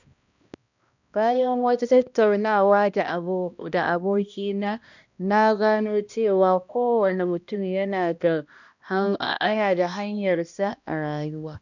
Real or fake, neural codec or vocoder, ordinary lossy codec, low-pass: fake; codec, 16 kHz, 1 kbps, X-Codec, HuBERT features, trained on balanced general audio; none; 7.2 kHz